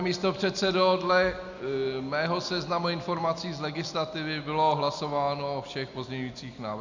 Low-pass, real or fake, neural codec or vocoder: 7.2 kHz; real; none